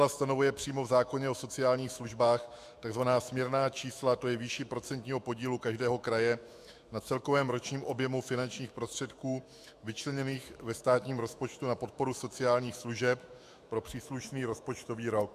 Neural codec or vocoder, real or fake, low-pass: autoencoder, 48 kHz, 128 numbers a frame, DAC-VAE, trained on Japanese speech; fake; 14.4 kHz